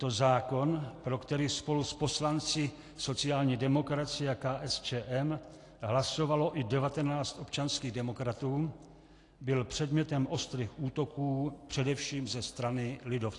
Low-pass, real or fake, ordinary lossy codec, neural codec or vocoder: 10.8 kHz; real; AAC, 48 kbps; none